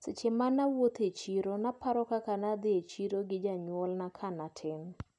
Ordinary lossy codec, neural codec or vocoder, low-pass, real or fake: none; none; 10.8 kHz; real